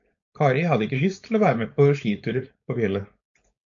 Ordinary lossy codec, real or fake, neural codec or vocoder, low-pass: AAC, 64 kbps; fake; codec, 16 kHz, 4.8 kbps, FACodec; 7.2 kHz